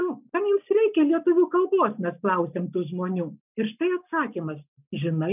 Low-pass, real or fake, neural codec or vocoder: 3.6 kHz; real; none